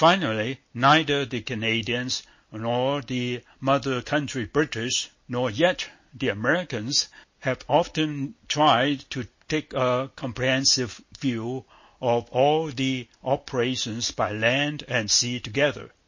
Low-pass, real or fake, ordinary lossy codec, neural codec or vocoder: 7.2 kHz; real; MP3, 32 kbps; none